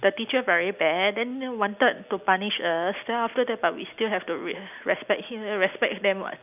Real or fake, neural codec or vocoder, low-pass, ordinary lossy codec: real; none; 3.6 kHz; none